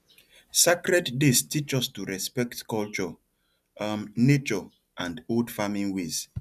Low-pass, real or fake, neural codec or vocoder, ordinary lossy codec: 14.4 kHz; real; none; none